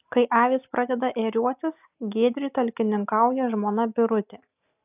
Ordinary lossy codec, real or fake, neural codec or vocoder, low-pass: AAC, 32 kbps; real; none; 3.6 kHz